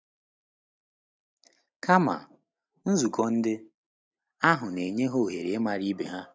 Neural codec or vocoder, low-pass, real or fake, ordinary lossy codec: none; none; real; none